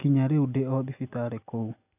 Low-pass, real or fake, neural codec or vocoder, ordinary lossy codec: 3.6 kHz; real; none; none